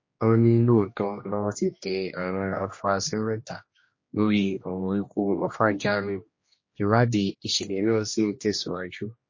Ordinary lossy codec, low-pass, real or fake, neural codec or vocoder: MP3, 32 kbps; 7.2 kHz; fake; codec, 16 kHz, 1 kbps, X-Codec, HuBERT features, trained on general audio